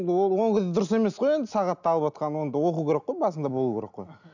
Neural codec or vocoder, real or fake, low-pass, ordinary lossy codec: none; real; 7.2 kHz; none